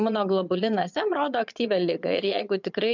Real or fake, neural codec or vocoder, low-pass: fake; vocoder, 22.05 kHz, 80 mel bands, Vocos; 7.2 kHz